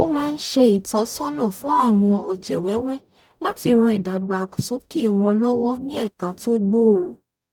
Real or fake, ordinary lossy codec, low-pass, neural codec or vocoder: fake; none; 19.8 kHz; codec, 44.1 kHz, 0.9 kbps, DAC